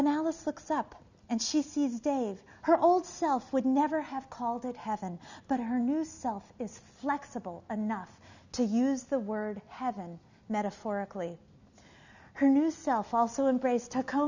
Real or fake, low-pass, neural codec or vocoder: real; 7.2 kHz; none